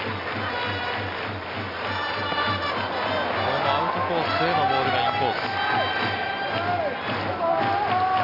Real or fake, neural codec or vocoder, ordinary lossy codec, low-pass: real; none; none; 5.4 kHz